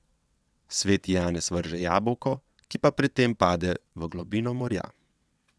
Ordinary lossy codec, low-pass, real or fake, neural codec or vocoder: none; none; fake; vocoder, 22.05 kHz, 80 mel bands, WaveNeXt